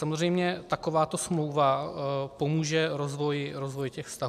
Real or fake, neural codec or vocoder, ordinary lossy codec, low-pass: real; none; MP3, 96 kbps; 14.4 kHz